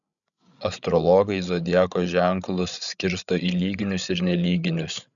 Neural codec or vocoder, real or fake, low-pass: codec, 16 kHz, 8 kbps, FreqCodec, larger model; fake; 7.2 kHz